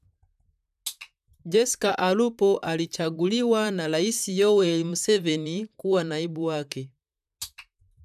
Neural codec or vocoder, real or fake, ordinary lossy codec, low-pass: vocoder, 44.1 kHz, 128 mel bands, Pupu-Vocoder; fake; none; 14.4 kHz